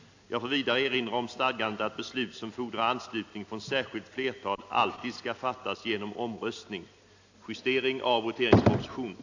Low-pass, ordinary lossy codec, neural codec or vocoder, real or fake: 7.2 kHz; AAC, 48 kbps; none; real